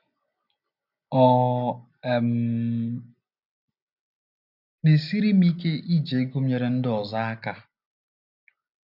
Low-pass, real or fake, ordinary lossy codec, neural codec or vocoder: 5.4 kHz; real; none; none